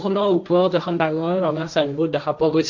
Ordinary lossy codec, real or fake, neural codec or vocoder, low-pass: none; fake; codec, 16 kHz, 1.1 kbps, Voila-Tokenizer; 7.2 kHz